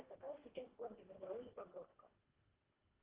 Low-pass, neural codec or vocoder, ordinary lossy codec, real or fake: 3.6 kHz; codec, 24 kHz, 1.5 kbps, HILCodec; Opus, 16 kbps; fake